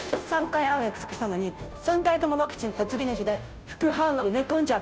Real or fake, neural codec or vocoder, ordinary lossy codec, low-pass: fake; codec, 16 kHz, 0.5 kbps, FunCodec, trained on Chinese and English, 25 frames a second; none; none